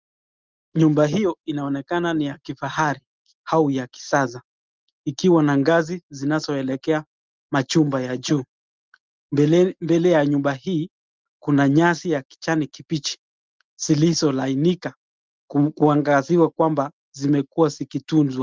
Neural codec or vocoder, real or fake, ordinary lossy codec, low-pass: none; real; Opus, 32 kbps; 7.2 kHz